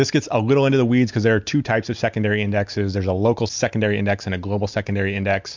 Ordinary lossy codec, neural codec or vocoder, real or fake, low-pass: MP3, 64 kbps; none; real; 7.2 kHz